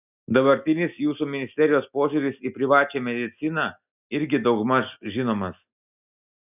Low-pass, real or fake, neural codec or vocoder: 3.6 kHz; real; none